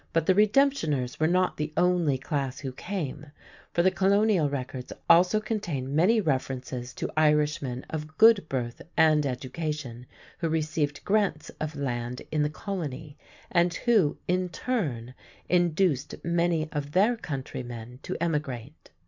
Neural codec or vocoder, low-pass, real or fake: none; 7.2 kHz; real